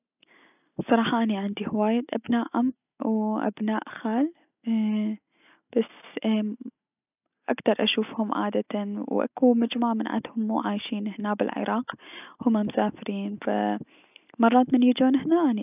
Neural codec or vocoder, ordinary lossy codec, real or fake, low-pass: none; none; real; 3.6 kHz